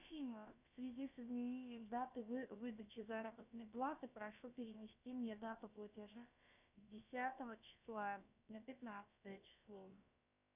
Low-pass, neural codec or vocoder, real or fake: 3.6 kHz; codec, 16 kHz, about 1 kbps, DyCAST, with the encoder's durations; fake